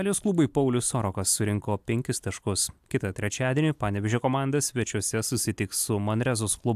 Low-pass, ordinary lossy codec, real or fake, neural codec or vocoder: 14.4 kHz; AAC, 96 kbps; real; none